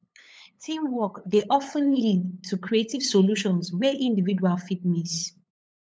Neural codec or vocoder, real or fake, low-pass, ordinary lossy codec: codec, 16 kHz, 8 kbps, FunCodec, trained on LibriTTS, 25 frames a second; fake; none; none